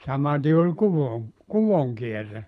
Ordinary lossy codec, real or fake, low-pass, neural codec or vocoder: none; fake; none; codec, 24 kHz, 6 kbps, HILCodec